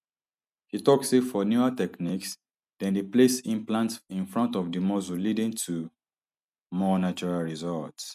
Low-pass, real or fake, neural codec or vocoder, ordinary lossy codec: 14.4 kHz; real; none; none